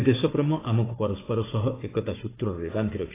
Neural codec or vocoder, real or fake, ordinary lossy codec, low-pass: codec, 16 kHz, 4 kbps, FunCodec, trained on Chinese and English, 50 frames a second; fake; AAC, 16 kbps; 3.6 kHz